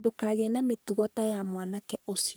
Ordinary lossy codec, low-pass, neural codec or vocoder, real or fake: none; none; codec, 44.1 kHz, 3.4 kbps, Pupu-Codec; fake